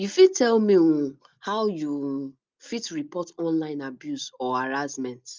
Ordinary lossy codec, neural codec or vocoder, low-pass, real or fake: Opus, 24 kbps; none; 7.2 kHz; real